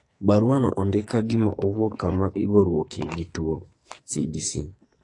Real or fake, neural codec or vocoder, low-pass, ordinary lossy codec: fake; codec, 44.1 kHz, 2.6 kbps, SNAC; 10.8 kHz; AAC, 48 kbps